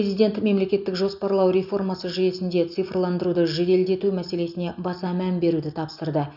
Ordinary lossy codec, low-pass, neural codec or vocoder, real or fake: none; 5.4 kHz; none; real